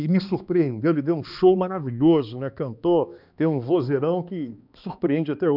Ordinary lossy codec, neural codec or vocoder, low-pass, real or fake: none; codec, 16 kHz, 2 kbps, X-Codec, HuBERT features, trained on balanced general audio; 5.4 kHz; fake